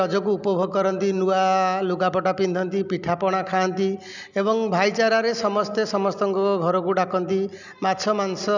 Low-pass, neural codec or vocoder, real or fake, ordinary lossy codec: 7.2 kHz; none; real; none